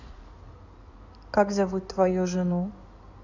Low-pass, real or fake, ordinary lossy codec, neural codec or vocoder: 7.2 kHz; fake; none; codec, 16 kHz in and 24 kHz out, 2.2 kbps, FireRedTTS-2 codec